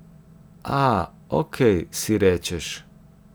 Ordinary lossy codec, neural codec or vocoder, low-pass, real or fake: none; none; none; real